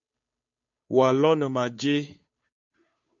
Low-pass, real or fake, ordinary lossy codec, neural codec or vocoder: 7.2 kHz; fake; MP3, 48 kbps; codec, 16 kHz, 2 kbps, FunCodec, trained on Chinese and English, 25 frames a second